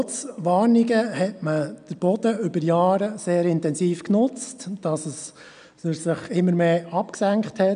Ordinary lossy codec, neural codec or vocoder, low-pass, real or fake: none; none; 9.9 kHz; real